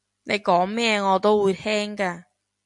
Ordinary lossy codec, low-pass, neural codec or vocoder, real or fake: AAC, 64 kbps; 10.8 kHz; none; real